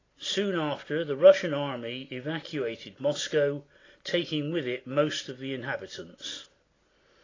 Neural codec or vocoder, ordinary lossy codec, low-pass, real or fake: none; AAC, 32 kbps; 7.2 kHz; real